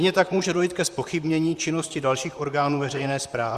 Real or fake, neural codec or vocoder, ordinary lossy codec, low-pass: fake; vocoder, 44.1 kHz, 128 mel bands, Pupu-Vocoder; Opus, 64 kbps; 14.4 kHz